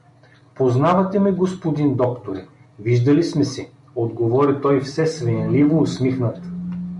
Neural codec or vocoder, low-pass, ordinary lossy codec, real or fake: none; 10.8 kHz; MP3, 64 kbps; real